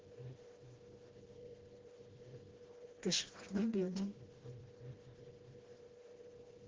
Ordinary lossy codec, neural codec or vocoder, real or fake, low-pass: Opus, 16 kbps; codec, 16 kHz, 1 kbps, FreqCodec, smaller model; fake; 7.2 kHz